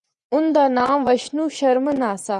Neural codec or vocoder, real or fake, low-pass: vocoder, 24 kHz, 100 mel bands, Vocos; fake; 10.8 kHz